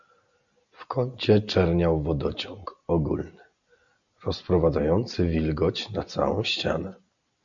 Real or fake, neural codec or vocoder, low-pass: real; none; 7.2 kHz